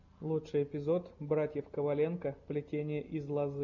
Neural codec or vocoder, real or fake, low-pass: none; real; 7.2 kHz